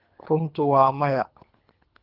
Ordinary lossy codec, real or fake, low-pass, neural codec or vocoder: Opus, 32 kbps; fake; 5.4 kHz; codec, 24 kHz, 3 kbps, HILCodec